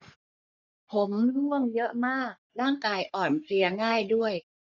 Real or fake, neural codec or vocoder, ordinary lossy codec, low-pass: fake; codec, 44.1 kHz, 3.4 kbps, Pupu-Codec; none; 7.2 kHz